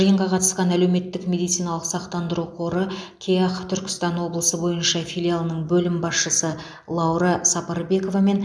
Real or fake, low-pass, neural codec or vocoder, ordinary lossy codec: real; none; none; none